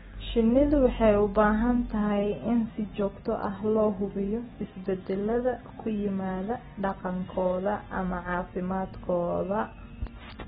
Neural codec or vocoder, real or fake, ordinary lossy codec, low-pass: none; real; AAC, 16 kbps; 19.8 kHz